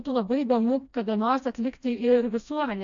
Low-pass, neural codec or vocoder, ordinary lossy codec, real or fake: 7.2 kHz; codec, 16 kHz, 1 kbps, FreqCodec, smaller model; AAC, 64 kbps; fake